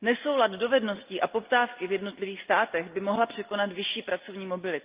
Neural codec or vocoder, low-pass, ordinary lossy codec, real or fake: none; 3.6 kHz; Opus, 24 kbps; real